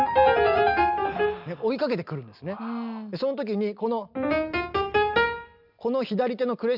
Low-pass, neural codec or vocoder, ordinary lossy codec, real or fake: 5.4 kHz; none; none; real